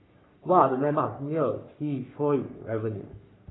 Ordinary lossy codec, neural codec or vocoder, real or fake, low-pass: AAC, 16 kbps; codec, 44.1 kHz, 3.4 kbps, Pupu-Codec; fake; 7.2 kHz